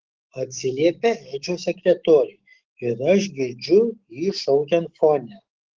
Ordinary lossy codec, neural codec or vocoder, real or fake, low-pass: Opus, 16 kbps; autoencoder, 48 kHz, 128 numbers a frame, DAC-VAE, trained on Japanese speech; fake; 7.2 kHz